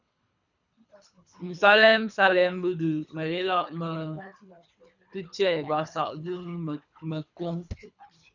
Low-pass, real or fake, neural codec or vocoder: 7.2 kHz; fake; codec, 24 kHz, 3 kbps, HILCodec